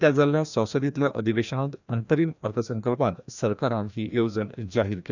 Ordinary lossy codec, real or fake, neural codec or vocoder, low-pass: none; fake; codec, 16 kHz, 1 kbps, FreqCodec, larger model; 7.2 kHz